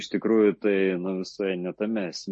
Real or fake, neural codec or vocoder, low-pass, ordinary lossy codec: real; none; 10.8 kHz; MP3, 32 kbps